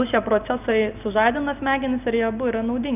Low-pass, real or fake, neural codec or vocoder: 3.6 kHz; real; none